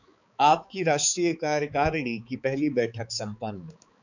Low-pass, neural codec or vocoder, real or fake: 7.2 kHz; codec, 16 kHz, 4 kbps, X-Codec, HuBERT features, trained on balanced general audio; fake